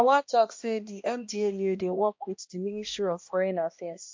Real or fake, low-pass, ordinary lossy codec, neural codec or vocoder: fake; 7.2 kHz; MP3, 48 kbps; codec, 16 kHz, 1 kbps, X-Codec, HuBERT features, trained on balanced general audio